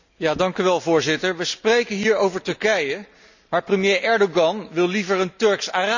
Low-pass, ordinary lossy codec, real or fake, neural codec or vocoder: 7.2 kHz; none; real; none